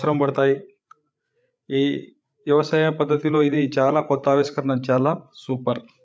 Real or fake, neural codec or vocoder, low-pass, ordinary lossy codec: fake; codec, 16 kHz, 8 kbps, FreqCodec, larger model; none; none